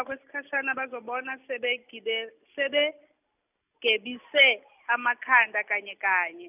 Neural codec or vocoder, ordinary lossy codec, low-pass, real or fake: none; none; 3.6 kHz; real